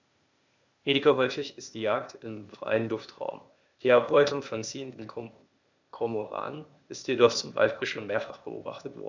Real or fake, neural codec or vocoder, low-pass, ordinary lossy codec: fake; codec, 16 kHz, 0.8 kbps, ZipCodec; 7.2 kHz; MP3, 64 kbps